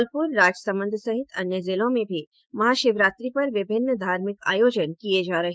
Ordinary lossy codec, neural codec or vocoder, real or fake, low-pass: none; codec, 16 kHz, 6 kbps, DAC; fake; none